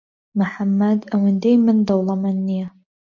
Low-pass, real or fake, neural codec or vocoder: 7.2 kHz; real; none